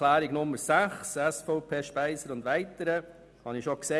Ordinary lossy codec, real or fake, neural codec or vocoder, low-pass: none; real; none; none